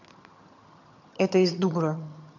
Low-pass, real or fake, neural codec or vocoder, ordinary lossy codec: 7.2 kHz; fake; vocoder, 22.05 kHz, 80 mel bands, HiFi-GAN; none